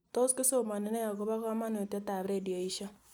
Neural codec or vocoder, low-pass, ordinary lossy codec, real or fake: none; none; none; real